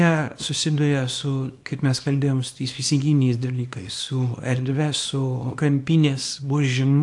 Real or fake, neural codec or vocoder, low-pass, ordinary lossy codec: fake; codec, 24 kHz, 0.9 kbps, WavTokenizer, small release; 10.8 kHz; AAC, 64 kbps